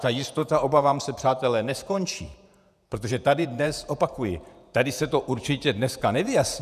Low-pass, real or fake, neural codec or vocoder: 14.4 kHz; fake; vocoder, 48 kHz, 128 mel bands, Vocos